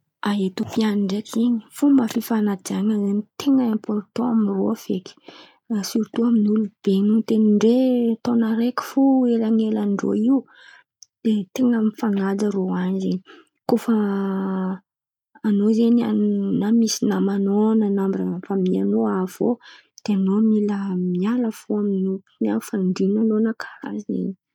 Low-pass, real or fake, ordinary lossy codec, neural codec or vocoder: 19.8 kHz; real; none; none